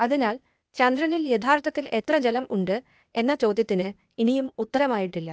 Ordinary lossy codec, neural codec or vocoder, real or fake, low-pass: none; codec, 16 kHz, 0.8 kbps, ZipCodec; fake; none